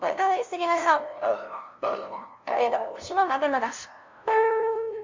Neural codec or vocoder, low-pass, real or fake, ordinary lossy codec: codec, 16 kHz, 0.5 kbps, FunCodec, trained on LibriTTS, 25 frames a second; 7.2 kHz; fake; MP3, 64 kbps